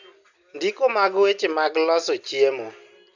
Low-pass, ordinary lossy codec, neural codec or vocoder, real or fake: 7.2 kHz; none; none; real